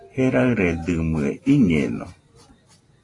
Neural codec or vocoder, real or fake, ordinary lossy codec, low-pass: none; real; AAC, 32 kbps; 10.8 kHz